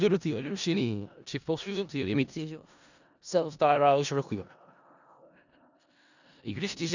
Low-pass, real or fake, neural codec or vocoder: 7.2 kHz; fake; codec, 16 kHz in and 24 kHz out, 0.4 kbps, LongCat-Audio-Codec, four codebook decoder